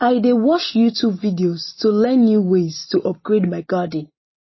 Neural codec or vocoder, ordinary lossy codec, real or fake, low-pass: none; MP3, 24 kbps; real; 7.2 kHz